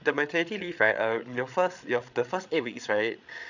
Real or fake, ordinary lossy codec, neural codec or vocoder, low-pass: fake; none; codec, 16 kHz, 8 kbps, FreqCodec, larger model; 7.2 kHz